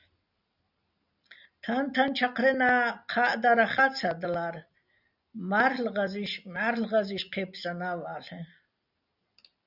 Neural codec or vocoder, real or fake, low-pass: none; real; 5.4 kHz